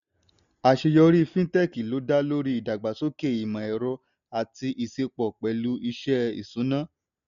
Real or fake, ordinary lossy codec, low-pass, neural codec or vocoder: real; none; 7.2 kHz; none